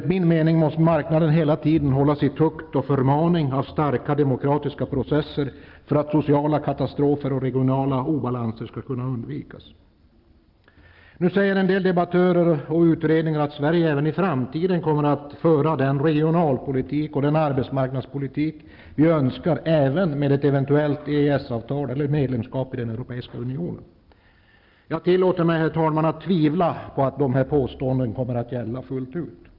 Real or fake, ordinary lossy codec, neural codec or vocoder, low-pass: real; Opus, 24 kbps; none; 5.4 kHz